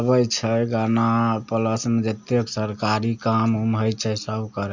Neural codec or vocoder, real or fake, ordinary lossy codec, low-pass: none; real; Opus, 64 kbps; 7.2 kHz